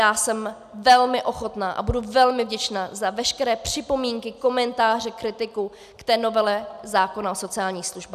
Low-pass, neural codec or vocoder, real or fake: 14.4 kHz; none; real